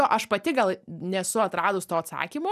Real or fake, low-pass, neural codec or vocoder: real; 14.4 kHz; none